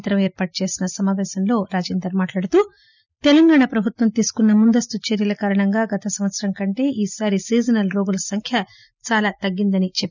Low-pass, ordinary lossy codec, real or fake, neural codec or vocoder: 7.2 kHz; none; real; none